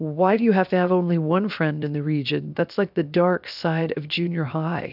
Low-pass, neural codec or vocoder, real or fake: 5.4 kHz; codec, 16 kHz, 0.7 kbps, FocalCodec; fake